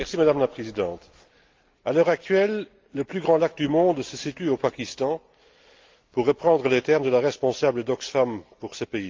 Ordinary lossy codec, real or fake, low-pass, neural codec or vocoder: Opus, 32 kbps; real; 7.2 kHz; none